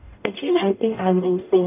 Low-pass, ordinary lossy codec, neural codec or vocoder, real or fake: 3.6 kHz; none; codec, 44.1 kHz, 0.9 kbps, DAC; fake